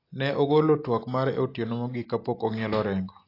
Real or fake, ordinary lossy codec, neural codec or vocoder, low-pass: real; AAC, 32 kbps; none; 5.4 kHz